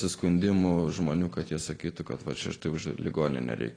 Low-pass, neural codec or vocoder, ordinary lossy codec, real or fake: 9.9 kHz; none; AAC, 32 kbps; real